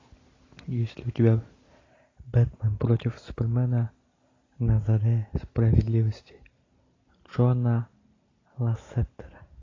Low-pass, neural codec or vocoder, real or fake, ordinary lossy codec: 7.2 kHz; none; real; AAC, 32 kbps